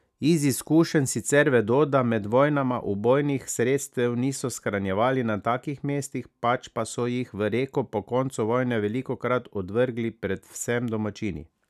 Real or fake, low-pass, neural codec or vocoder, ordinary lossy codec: real; 14.4 kHz; none; none